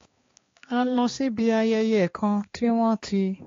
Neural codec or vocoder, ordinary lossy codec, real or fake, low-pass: codec, 16 kHz, 2 kbps, X-Codec, HuBERT features, trained on balanced general audio; AAC, 32 kbps; fake; 7.2 kHz